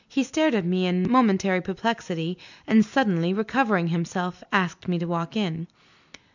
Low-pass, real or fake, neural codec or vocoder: 7.2 kHz; real; none